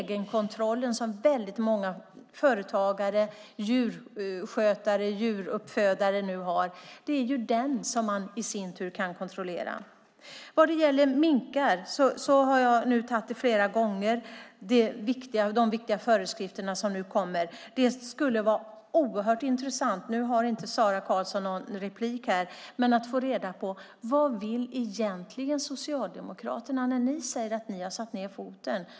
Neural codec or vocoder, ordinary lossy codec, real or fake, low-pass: none; none; real; none